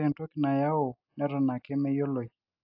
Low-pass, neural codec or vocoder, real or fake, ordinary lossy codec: 5.4 kHz; none; real; AAC, 48 kbps